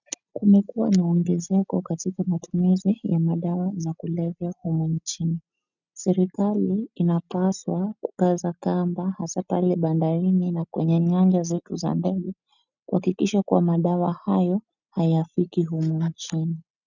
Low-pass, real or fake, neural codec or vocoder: 7.2 kHz; real; none